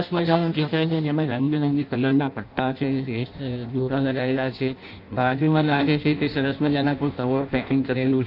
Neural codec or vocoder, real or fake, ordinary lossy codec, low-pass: codec, 16 kHz in and 24 kHz out, 0.6 kbps, FireRedTTS-2 codec; fake; none; 5.4 kHz